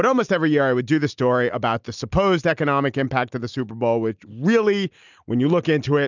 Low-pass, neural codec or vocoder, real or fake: 7.2 kHz; none; real